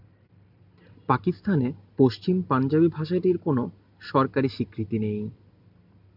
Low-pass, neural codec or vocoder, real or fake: 5.4 kHz; none; real